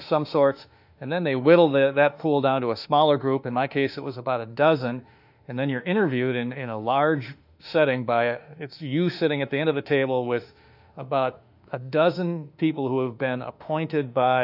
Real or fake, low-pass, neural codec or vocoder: fake; 5.4 kHz; autoencoder, 48 kHz, 32 numbers a frame, DAC-VAE, trained on Japanese speech